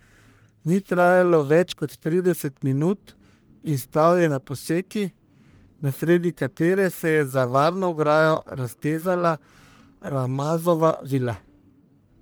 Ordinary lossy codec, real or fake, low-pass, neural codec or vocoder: none; fake; none; codec, 44.1 kHz, 1.7 kbps, Pupu-Codec